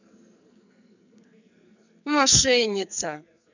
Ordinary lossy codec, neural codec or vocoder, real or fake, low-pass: none; codec, 44.1 kHz, 2.6 kbps, SNAC; fake; 7.2 kHz